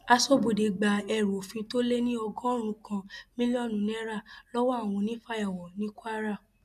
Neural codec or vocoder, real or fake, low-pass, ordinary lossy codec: none; real; 14.4 kHz; none